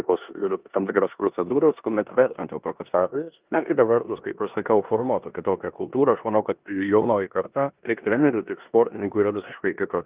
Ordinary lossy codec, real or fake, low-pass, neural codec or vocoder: Opus, 24 kbps; fake; 3.6 kHz; codec, 16 kHz in and 24 kHz out, 0.9 kbps, LongCat-Audio-Codec, four codebook decoder